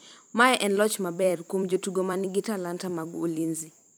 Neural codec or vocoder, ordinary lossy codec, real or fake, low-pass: vocoder, 44.1 kHz, 128 mel bands every 256 samples, BigVGAN v2; none; fake; none